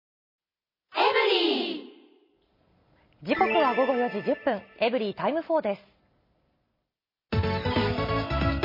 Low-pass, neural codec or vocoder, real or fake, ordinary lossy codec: 5.4 kHz; none; real; MP3, 24 kbps